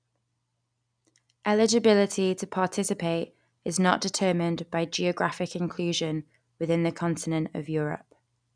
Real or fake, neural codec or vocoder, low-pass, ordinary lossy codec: real; none; 9.9 kHz; none